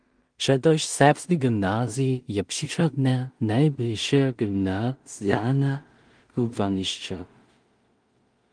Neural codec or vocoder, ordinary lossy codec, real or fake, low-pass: codec, 16 kHz in and 24 kHz out, 0.4 kbps, LongCat-Audio-Codec, two codebook decoder; Opus, 24 kbps; fake; 9.9 kHz